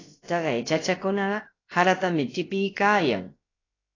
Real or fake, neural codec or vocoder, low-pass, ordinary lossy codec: fake; codec, 16 kHz, about 1 kbps, DyCAST, with the encoder's durations; 7.2 kHz; AAC, 32 kbps